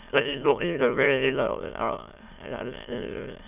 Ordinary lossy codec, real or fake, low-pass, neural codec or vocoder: none; fake; 3.6 kHz; autoencoder, 22.05 kHz, a latent of 192 numbers a frame, VITS, trained on many speakers